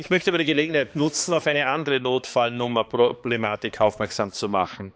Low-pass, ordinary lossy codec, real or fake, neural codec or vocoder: none; none; fake; codec, 16 kHz, 2 kbps, X-Codec, HuBERT features, trained on balanced general audio